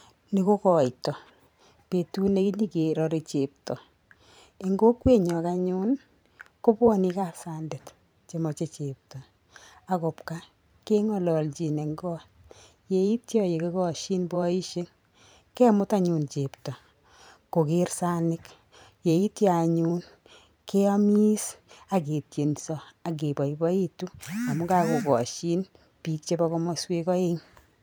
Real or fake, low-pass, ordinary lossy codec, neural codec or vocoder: fake; none; none; vocoder, 44.1 kHz, 128 mel bands every 512 samples, BigVGAN v2